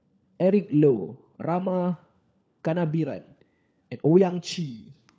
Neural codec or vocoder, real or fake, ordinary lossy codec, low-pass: codec, 16 kHz, 4 kbps, FunCodec, trained on LibriTTS, 50 frames a second; fake; none; none